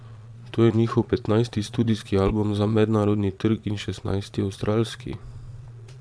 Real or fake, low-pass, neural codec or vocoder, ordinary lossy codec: fake; none; vocoder, 22.05 kHz, 80 mel bands, Vocos; none